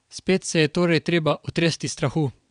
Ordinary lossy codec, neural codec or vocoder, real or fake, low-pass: none; vocoder, 22.05 kHz, 80 mel bands, WaveNeXt; fake; 9.9 kHz